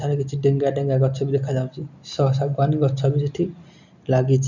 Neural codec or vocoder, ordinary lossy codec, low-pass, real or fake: none; none; 7.2 kHz; real